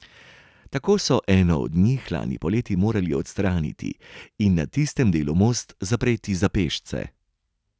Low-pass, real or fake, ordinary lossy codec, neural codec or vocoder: none; real; none; none